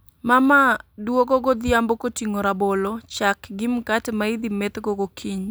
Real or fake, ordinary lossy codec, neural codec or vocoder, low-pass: real; none; none; none